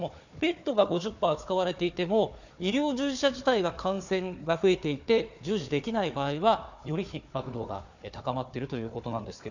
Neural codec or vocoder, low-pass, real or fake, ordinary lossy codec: codec, 16 kHz, 4 kbps, FunCodec, trained on Chinese and English, 50 frames a second; 7.2 kHz; fake; none